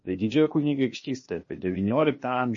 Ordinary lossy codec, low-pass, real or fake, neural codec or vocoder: MP3, 32 kbps; 7.2 kHz; fake; codec, 16 kHz, 0.8 kbps, ZipCodec